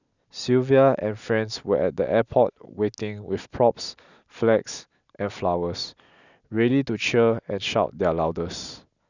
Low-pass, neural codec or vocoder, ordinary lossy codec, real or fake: 7.2 kHz; none; none; real